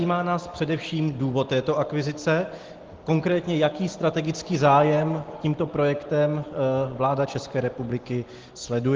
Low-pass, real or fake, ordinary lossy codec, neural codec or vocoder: 7.2 kHz; real; Opus, 16 kbps; none